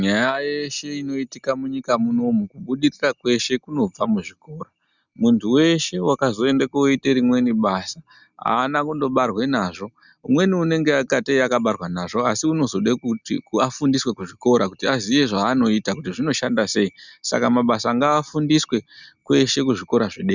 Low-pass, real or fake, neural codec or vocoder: 7.2 kHz; real; none